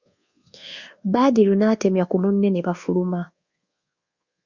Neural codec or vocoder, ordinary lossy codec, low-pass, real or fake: codec, 24 kHz, 1.2 kbps, DualCodec; Opus, 64 kbps; 7.2 kHz; fake